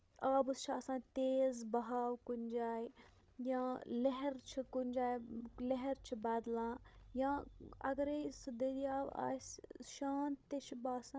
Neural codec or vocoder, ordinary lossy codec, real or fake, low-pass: codec, 16 kHz, 16 kbps, FreqCodec, larger model; none; fake; none